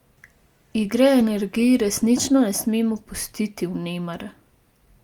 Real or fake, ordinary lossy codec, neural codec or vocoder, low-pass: real; Opus, 32 kbps; none; 19.8 kHz